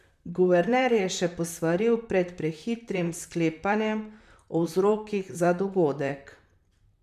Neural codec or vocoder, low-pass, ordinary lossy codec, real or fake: vocoder, 44.1 kHz, 128 mel bands, Pupu-Vocoder; 14.4 kHz; none; fake